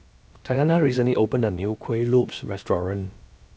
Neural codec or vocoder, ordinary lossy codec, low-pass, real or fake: codec, 16 kHz, about 1 kbps, DyCAST, with the encoder's durations; none; none; fake